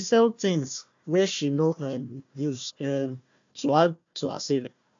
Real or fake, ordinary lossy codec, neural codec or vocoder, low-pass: fake; AAC, 64 kbps; codec, 16 kHz, 1 kbps, FunCodec, trained on Chinese and English, 50 frames a second; 7.2 kHz